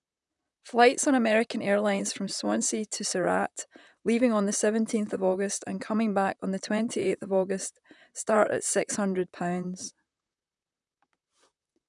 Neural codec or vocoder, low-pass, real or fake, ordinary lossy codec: vocoder, 44.1 kHz, 128 mel bands, Pupu-Vocoder; 10.8 kHz; fake; none